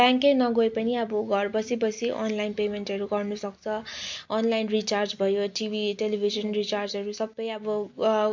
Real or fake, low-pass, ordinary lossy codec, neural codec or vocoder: real; 7.2 kHz; MP3, 48 kbps; none